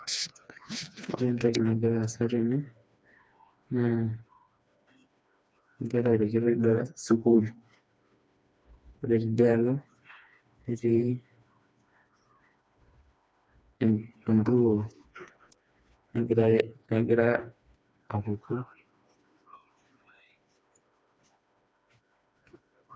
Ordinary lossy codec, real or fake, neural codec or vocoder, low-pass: none; fake; codec, 16 kHz, 2 kbps, FreqCodec, smaller model; none